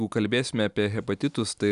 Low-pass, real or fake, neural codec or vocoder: 10.8 kHz; real; none